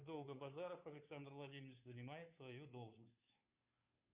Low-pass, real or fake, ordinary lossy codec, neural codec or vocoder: 3.6 kHz; fake; Opus, 64 kbps; codec, 16 kHz, 2 kbps, FunCodec, trained on Chinese and English, 25 frames a second